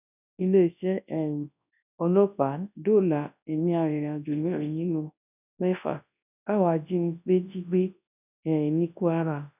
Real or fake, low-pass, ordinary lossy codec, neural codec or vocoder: fake; 3.6 kHz; none; codec, 24 kHz, 0.9 kbps, WavTokenizer, large speech release